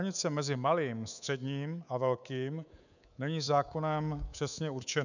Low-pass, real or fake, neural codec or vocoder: 7.2 kHz; fake; codec, 24 kHz, 3.1 kbps, DualCodec